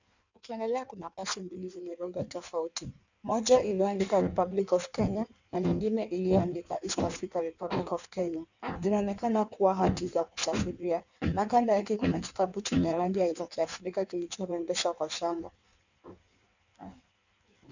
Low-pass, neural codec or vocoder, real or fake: 7.2 kHz; codec, 16 kHz in and 24 kHz out, 1.1 kbps, FireRedTTS-2 codec; fake